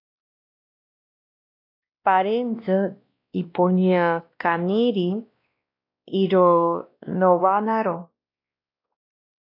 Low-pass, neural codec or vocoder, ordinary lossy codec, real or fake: 5.4 kHz; codec, 16 kHz, 1 kbps, X-Codec, WavLM features, trained on Multilingual LibriSpeech; AAC, 32 kbps; fake